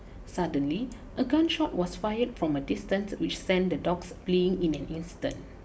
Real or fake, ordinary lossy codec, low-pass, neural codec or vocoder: real; none; none; none